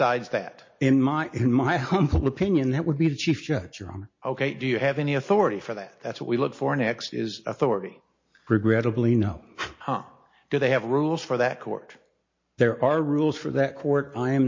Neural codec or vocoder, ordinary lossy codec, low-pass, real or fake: none; MP3, 32 kbps; 7.2 kHz; real